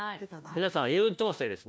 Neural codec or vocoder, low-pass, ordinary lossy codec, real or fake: codec, 16 kHz, 1 kbps, FunCodec, trained on LibriTTS, 50 frames a second; none; none; fake